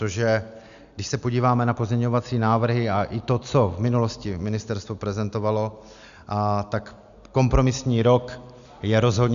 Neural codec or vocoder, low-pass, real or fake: none; 7.2 kHz; real